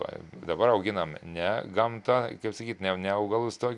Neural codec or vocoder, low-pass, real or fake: none; 10.8 kHz; real